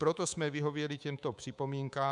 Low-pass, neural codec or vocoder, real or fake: 10.8 kHz; codec, 24 kHz, 3.1 kbps, DualCodec; fake